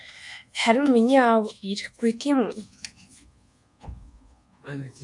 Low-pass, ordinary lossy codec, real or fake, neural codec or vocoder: 10.8 kHz; MP3, 64 kbps; fake; codec, 24 kHz, 1.2 kbps, DualCodec